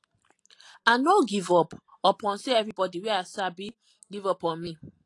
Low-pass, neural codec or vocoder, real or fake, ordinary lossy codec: 10.8 kHz; none; real; AAC, 48 kbps